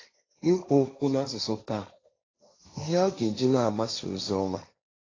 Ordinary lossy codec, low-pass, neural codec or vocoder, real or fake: AAC, 32 kbps; 7.2 kHz; codec, 16 kHz, 1.1 kbps, Voila-Tokenizer; fake